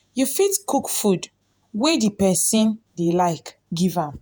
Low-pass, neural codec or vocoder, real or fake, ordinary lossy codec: none; vocoder, 48 kHz, 128 mel bands, Vocos; fake; none